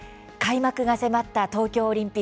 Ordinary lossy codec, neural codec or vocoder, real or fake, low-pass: none; none; real; none